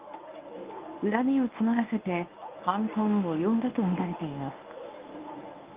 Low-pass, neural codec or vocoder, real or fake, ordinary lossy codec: 3.6 kHz; codec, 24 kHz, 0.9 kbps, WavTokenizer, medium speech release version 1; fake; Opus, 24 kbps